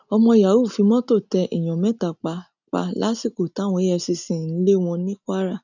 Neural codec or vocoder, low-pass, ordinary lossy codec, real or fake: none; 7.2 kHz; none; real